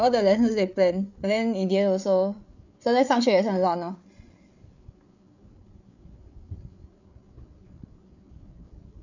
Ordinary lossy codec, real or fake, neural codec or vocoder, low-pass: none; fake; codec, 16 kHz, 8 kbps, FreqCodec, larger model; 7.2 kHz